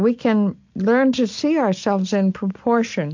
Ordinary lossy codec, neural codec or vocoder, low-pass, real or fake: MP3, 48 kbps; none; 7.2 kHz; real